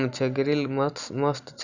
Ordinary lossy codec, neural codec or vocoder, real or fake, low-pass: none; none; real; 7.2 kHz